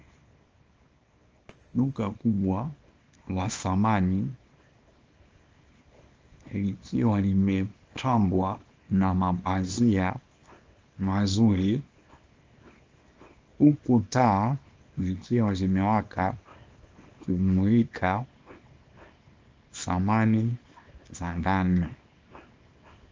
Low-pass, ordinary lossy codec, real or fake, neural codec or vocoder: 7.2 kHz; Opus, 24 kbps; fake; codec, 24 kHz, 0.9 kbps, WavTokenizer, small release